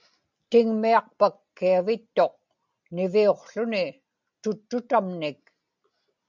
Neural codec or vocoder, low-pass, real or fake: none; 7.2 kHz; real